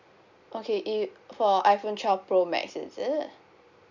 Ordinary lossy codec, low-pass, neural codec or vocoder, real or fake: none; 7.2 kHz; none; real